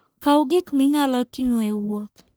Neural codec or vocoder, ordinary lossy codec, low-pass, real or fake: codec, 44.1 kHz, 1.7 kbps, Pupu-Codec; none; none; fake